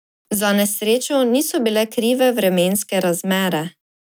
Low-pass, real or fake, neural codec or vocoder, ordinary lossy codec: none; real; none; none